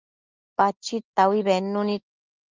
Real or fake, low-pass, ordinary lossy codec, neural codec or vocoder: real; 7.2 kHz; Opus, 24 kbps; none